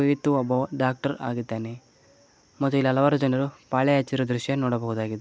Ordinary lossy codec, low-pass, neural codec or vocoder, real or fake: none; none; none; real